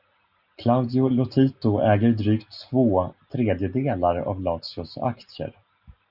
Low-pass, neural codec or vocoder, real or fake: 5.4 kHz; none; real